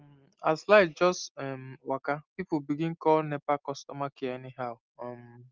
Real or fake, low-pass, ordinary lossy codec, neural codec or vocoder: real; 7.2 kHz; Opus, 32 kbps; none